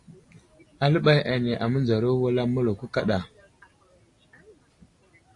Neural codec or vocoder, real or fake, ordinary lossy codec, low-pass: none; real; AAC, 48 kbps; 10.8 kHz